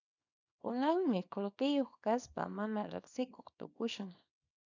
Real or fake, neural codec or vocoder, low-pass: fake; codec, 24 kHz, 0.9 kbps, WavTokenizer, small release; 7.2 kHz